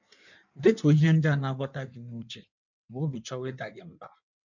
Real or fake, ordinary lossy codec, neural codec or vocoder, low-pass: fake; none; codec, 16 kHz in and 24 kHz out, 1.1 kbps, FireRedTTS-2 codec; 7.2 kHz